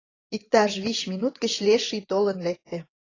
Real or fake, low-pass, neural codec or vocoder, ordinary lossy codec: fake; 7.2 kHz; vocoder, 24 kHz, 100 mel bands, Vocos; AAC, 32 kbps